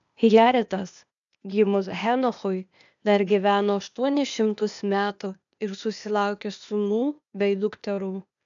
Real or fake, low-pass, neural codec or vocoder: fake; 7.2 kHz; codec, 16 kHz, 0.8 kbps, ZipCodec